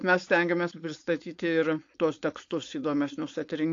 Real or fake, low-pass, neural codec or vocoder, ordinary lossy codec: fake; 7.2 kHz; codec, 16 kHz, 4.8 kbps, FACodec; AAC, 48 kbps